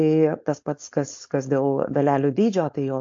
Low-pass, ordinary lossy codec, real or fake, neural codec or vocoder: 7.2 kHz; AAC, 48 kbps; fake; codec, 16 kHz, 4.8 kbps, FACodec